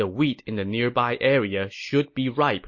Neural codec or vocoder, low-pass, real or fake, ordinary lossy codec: none; 7.2 kHz; real; MP3, 32 kbps